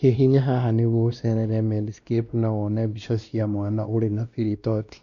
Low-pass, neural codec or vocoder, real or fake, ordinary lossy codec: 7.2 kHz; codec, 16 kHz, 1 kbps, X-Codec, WavLM features, trained on Multilingual LibriSpeech; fake; Opus, 64 kbps